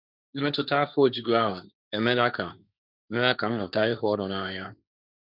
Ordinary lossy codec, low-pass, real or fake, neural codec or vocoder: none; 5.4 kHz; fake; codec, 16 kHz, 1.1 kbps, Voila-Tokenizer